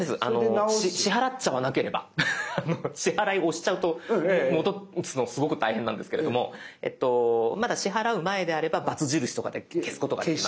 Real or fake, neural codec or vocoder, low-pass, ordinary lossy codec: real; none; none; none